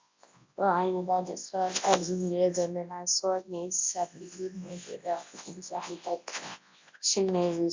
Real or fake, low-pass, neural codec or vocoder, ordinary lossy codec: fake; 7.2 kHz; codec, 24 kHz, 0.9 kbps, WavTokenizer, large speech release; MP3, 64 kbps